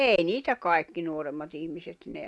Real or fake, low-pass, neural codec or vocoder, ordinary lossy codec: real; none; none; none